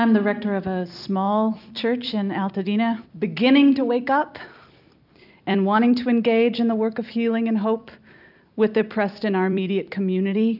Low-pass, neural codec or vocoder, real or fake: 5.4 kHz; vocoder, 44.1 kHz, 128 mel bands every 256 samples, BigVGAN v2; fake